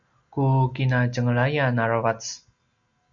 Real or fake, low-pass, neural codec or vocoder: real; 7.2 kHz; none